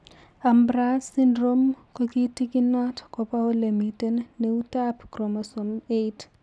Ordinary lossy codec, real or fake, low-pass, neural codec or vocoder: none; real; none; none